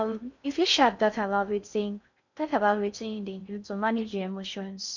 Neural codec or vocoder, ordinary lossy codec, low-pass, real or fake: codec, 16 kHz in and 24 kHz out, 0.6 kbps, FocalCodec, streaming, 4096 codes; none; 7.2 kHz; fake